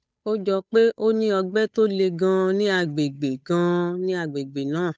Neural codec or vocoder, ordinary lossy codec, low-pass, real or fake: codec, 16 kHz, 8 kbps, FunCodec, trained on Chinese and English, 25 frames a second; none; none; fake